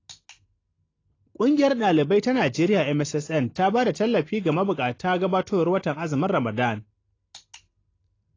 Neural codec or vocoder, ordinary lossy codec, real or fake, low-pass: none; AAC, 32 kbps; real; 7.2 kHz